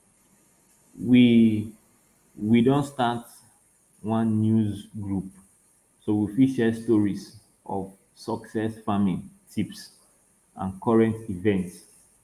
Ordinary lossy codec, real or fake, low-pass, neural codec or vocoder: Opus, 32 kbps; real; 14.4 kHz; none